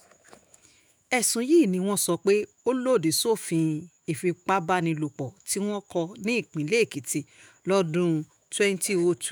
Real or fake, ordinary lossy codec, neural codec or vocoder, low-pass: fake; none; autoencoder, 48 kHz, 128 numbers a frame, DAC-VAE, trained on Japanese speech; none